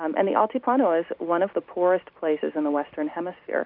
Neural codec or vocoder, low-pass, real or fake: none; 5.4 kHz; real